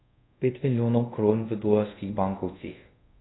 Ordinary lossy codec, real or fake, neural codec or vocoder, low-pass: AAC, 16 kbps; fake; codec, 24 kHz, 0.5 kbps, DualCodec; 7.2 kHz